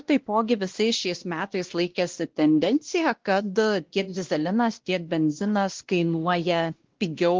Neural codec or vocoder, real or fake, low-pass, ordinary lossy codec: codec, 16 kHz, 1 kbps, X-Codec, WavLM features, trained on Multilingual LibriSpeech; fake; 7.2 kHz; Opus, 16 kbps